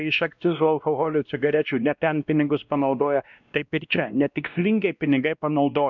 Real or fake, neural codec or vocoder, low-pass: fake; codec, 16 kHz, 1 kbps, X-Codec, WavLM features, trained on Multilingual LibriSpeech; 7.2 kHz